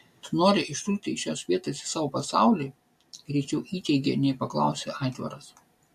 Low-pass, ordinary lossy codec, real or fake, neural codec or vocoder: 14.4 kHz; MP3, 64 kbps; real; none